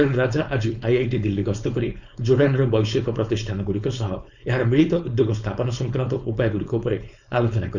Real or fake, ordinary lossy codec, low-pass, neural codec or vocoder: fake; none; 7.2 kHz; codec, 16 kHz, 4.8 kbps, FACodec